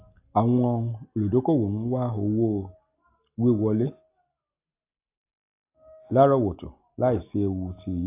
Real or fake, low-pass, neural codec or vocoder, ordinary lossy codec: real; 3.6 kHz; none; AAC, 24 kbps